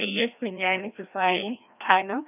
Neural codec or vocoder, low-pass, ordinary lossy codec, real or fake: codec, 16 kHz, 1 kbps, FreqCodec, larger model; 3.6 kHz; none; fake